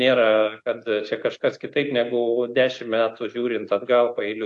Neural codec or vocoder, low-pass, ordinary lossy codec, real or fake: none; 10.8 kHz; AAC, 64 kbps; real